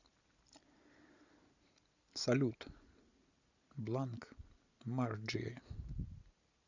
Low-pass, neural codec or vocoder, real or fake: 7.2 kHz; none; real